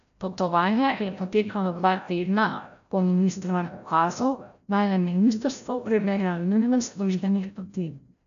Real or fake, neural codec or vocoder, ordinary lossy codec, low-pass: fake; codec, 16 kHz, 0.5 kbps, FreqCodec, larger model; none; 7.2 kHz